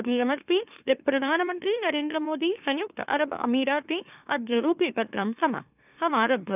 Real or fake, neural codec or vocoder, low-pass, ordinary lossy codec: fake; autoencoder, 44.1 kHz, a latent of 192 numbers a frame, MeloTTS; 3.6 kHz; none